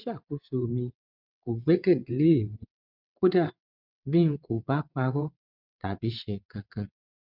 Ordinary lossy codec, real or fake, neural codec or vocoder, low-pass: none; fake; vocoder, 22.05 kHz, 80 mel bands, WaveNeXt; 5.4 kHz